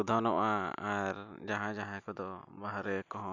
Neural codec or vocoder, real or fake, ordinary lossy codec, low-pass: none; real; none; 7.2 kHz